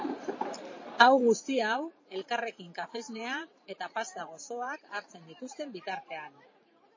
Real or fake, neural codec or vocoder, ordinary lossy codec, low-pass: fake; vocoder, 44.1 kHz, 128 mel bands every 512 samples, BigVGAN v2; MP3, 32 kbps; 7.2 kHz